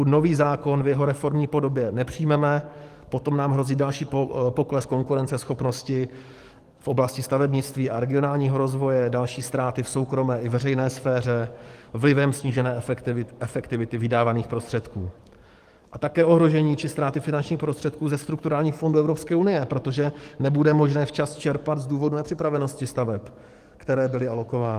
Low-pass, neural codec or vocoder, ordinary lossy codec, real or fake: 14.4 kHz; codec, 44.1 kHz, 7.8 kbps, DAC; Opus, 24 kbps; fake